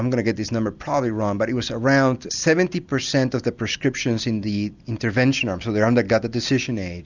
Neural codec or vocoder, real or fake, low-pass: none; real; 7.2 kHz